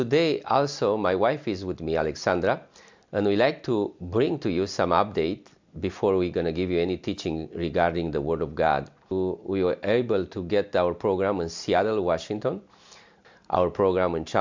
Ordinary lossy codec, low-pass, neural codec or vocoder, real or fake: MP3, 64 kbps; 7.2 kHz; none; real